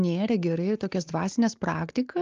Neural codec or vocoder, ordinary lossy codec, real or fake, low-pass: none; Opus, 32 kbps; real; 7.2 kHz